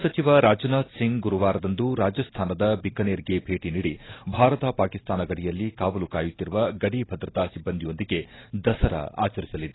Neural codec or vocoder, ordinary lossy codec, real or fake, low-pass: none; AAC, 16 kbps; real; 7.2 kHz